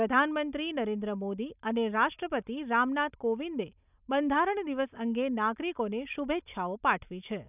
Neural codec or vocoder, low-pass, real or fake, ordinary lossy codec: none; 3.6 kHz; real; none